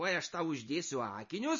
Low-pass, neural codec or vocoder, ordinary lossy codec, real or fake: 7.2 kHz; none; MP3, 32 kbps; real